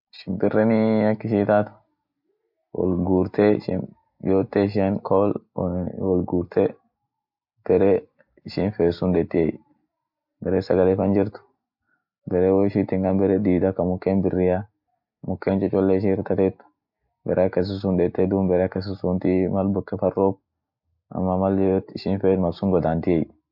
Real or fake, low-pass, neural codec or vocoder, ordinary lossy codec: real; 5.4 kHz; none; MP3, 48 kbps